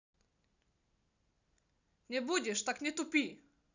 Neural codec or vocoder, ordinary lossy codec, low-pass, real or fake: none; none; 7.2 kHz; real